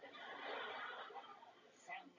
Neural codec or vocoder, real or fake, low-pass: none; real; 7.2 kHz